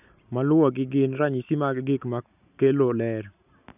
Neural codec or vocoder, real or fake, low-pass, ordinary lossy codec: none; real; 3.6 kHz; none